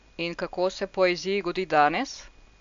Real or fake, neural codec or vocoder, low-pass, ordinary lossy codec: real; none; 7.2 kHz; AAC, 64 kbps